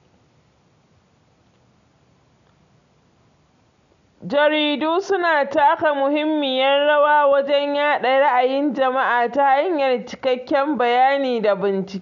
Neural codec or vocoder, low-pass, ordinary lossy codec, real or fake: none; 7.2 kHz; none; real